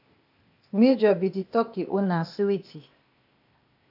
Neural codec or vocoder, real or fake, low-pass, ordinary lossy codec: codec, 16 kHz, 0.8 kbps, ZipCodec; fake; 5.4 kHz; AAC, 48 kbps